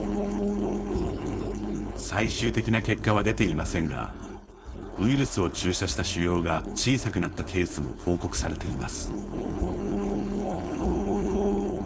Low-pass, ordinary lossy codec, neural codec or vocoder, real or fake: none; none; codec, 16 kHz, 4.8 kbps, FACodec; fake